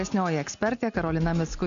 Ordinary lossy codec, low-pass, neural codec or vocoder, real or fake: AAC, 96 kbps; 7.2 kHz; none; real